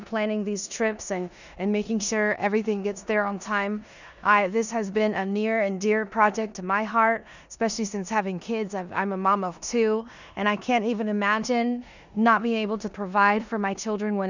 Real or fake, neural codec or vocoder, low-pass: fake; codec, 16 kHz in and 24 kHz out, 0.9 kbps, LongCat-Audio-Codec, four codebook decoder; 7.2 kHz